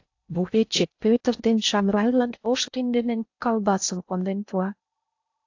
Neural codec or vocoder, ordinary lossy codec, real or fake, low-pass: codec, 16 kHz in and 24 kHz out, 0.6 kbps, FocalCodec, streaming, 2048 codes; AAC, 48 kbps; fake; 7.2 kHz